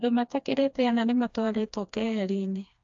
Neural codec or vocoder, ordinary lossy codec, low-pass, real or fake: codec, 16 kHz, 2 kbps, FreqCodec, smaller model; AAC, 64 kbps; 7.2 kHz; fake